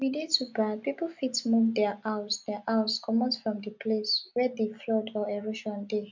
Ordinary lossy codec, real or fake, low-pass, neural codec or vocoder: MP3, 64 kbps; real; 7.2 kHz; none